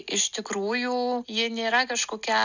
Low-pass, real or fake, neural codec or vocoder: 7.2 kHz; real; none